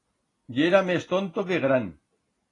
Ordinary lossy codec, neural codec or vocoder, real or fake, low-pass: AAC, 32 kbps; none; real; 10.8 kHz